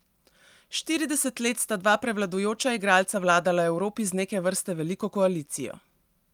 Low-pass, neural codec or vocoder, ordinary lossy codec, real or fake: 19.8 kHz; none; Opus, 32 kbps; real